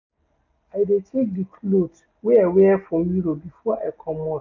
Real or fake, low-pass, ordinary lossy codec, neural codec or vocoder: real; 7.2 kHz; none; none